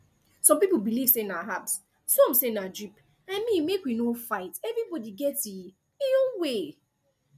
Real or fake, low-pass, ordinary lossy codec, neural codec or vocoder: real; 14.4 kHz; none; none